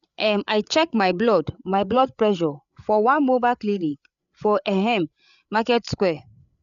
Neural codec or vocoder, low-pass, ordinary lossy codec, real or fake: codec, 16 kHz, 8 kbps, FreqCodec, larger model; 7.2 kHz; none; fake